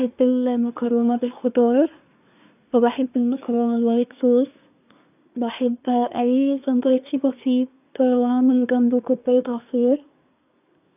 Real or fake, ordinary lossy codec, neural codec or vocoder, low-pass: fake; none; codec, 24 kHz, 1 kbps, SNAC; 3.6 kHz